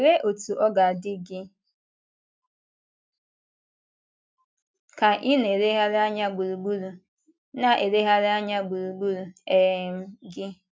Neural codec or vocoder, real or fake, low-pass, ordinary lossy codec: none; real; none; none